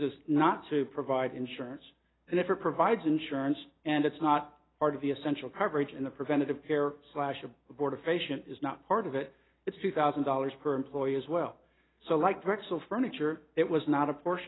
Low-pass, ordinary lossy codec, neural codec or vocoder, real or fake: 7.2 kHz; AAC, 16 kbps; none; real